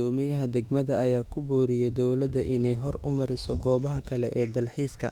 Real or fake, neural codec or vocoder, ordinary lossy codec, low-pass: fake; autoencoder, 48 kHz, 32 numbers a frame, DAC-VAE, trained on Japanese speech; Opus, 64 kbps; 19.8 kHz